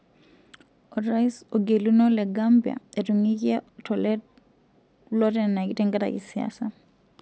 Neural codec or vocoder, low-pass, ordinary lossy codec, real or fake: none; none; none; real